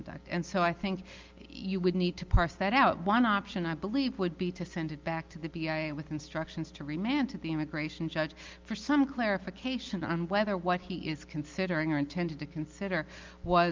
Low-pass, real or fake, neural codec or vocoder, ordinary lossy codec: 7.2 kHz; real; none; Opus, 24 kbps